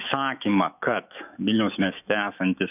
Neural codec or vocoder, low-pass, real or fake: autoencoder, 48 kHz, 128 numbers a frame, DAC-VAE, trained on Japanese speech; 3.6 kHz; fake